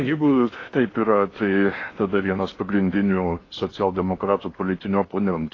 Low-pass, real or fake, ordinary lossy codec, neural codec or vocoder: 7.2 kHz; fake; AAC, 32 kbps; codec, 16 kHz in and 24 kHz out, 0.8 kbps, FocalCodec, streaming, 65536 codes